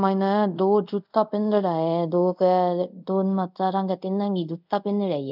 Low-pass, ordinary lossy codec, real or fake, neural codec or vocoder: 5.4 kHz; none; fake; codec, 24 kHz, 0.5 kbps, DualCodec